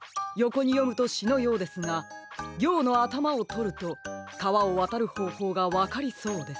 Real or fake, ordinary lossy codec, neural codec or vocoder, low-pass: real; none; none; none